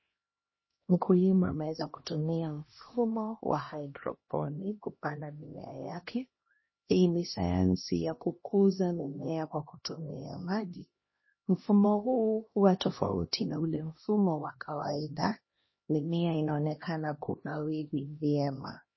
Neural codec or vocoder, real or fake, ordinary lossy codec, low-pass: codec, 16 kHz, 1 kbps, X-Codec, HuBERT features, trained on LibriSpeech; fake; MP3, 24 kbps; 7.2 kHz